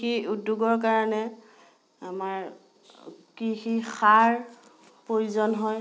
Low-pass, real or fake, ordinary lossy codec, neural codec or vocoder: none; real; none; none